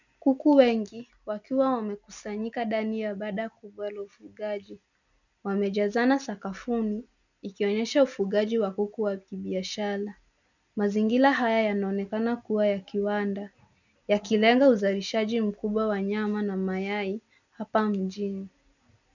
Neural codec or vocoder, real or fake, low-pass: none; real; 7.2 kHz